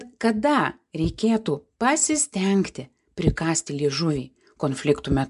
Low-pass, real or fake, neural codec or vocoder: 10.8 kHz; real; none